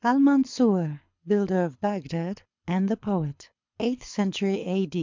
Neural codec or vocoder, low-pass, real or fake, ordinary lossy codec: codec, 24 kHz, 6 kbps, HILCodec; 7.2 kHz; fake; MP3, 64 kbps